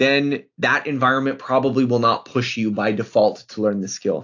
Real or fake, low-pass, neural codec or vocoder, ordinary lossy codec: real; 7.2 kHz; none; AAC, 48 kbps